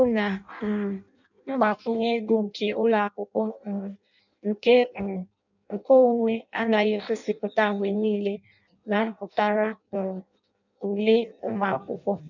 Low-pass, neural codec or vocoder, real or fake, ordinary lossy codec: 7.2 kHz; codec, 16 kHz in and 24 kHz out, 0.6 kbps, FireRedTTS-2 codec; fake; none